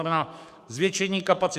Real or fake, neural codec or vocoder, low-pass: fake; codec, 44.1 kHz, 7.8 kbps, DAC; 14.4 kHz